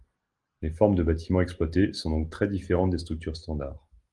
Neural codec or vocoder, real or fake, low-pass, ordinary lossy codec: none; real; 10.8 kHz; Opus, 24 kbps